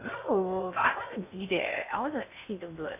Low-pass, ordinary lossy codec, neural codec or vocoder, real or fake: 3.6 kHz; MP3, 32 kbps; codec, 16 kHz in and 24 kHz out, 0.6 kbps, FocalCodec, streaming, 2048 codes; fake